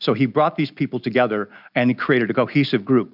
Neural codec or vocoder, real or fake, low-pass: none; real; 5.4 kHz